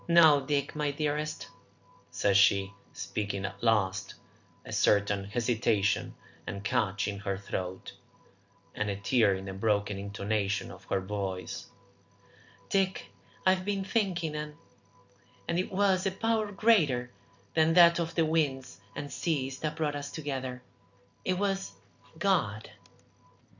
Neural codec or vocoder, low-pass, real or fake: none; 7.2 kHz; real